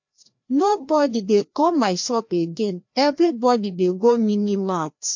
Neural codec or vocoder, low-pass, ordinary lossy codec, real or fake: codec, 16 kHz, 1 kbps, FreqCodec, larger model; 7.2 kHz; MP3, 48 kbps; fake